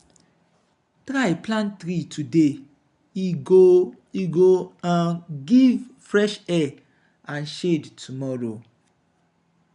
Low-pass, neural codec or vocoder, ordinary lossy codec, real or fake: 10.8 kHz; none; none; real